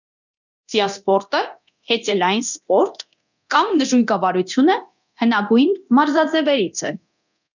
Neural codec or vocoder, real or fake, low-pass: codec, 24 kHz, 0.9 kbps, DualCodec; fake; 7.2 kHz